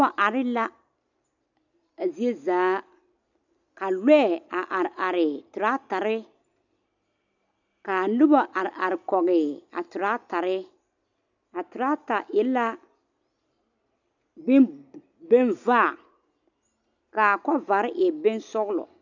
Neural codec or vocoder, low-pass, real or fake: none; 7.2 kHz; real